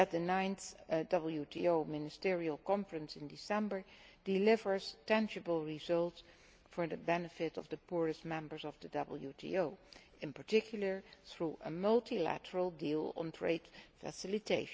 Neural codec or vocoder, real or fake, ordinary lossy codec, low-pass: none; real; none; none